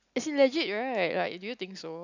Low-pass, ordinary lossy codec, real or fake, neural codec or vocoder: 7.2 kHz; none; real; none